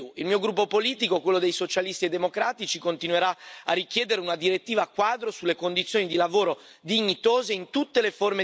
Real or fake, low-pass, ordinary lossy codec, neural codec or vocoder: real; none; none; none